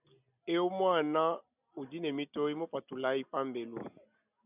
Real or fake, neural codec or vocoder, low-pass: real; none; 3.6 kHz